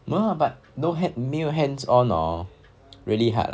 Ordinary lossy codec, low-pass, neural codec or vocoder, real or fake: none; none; none; real